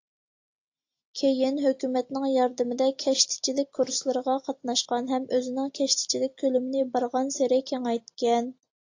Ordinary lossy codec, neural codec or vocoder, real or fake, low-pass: AAC, 48 kbps; none; real; 7.2 kHz